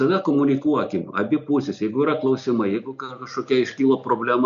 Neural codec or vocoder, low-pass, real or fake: none; 7.2 kHz; real